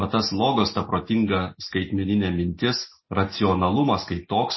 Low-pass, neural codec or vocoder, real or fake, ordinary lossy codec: 7.2 kHz; none; real; MP3, 24 kbps